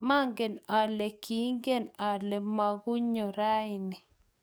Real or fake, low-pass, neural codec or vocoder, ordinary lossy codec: fake; none; codec, 44.1 kHz, 7.8 kbps, DAC; none